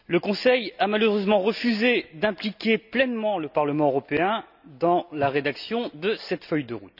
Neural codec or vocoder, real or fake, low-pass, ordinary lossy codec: none; real; 5.4 kHz; none